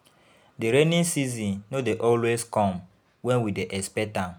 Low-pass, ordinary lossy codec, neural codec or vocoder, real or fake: none; none; none; real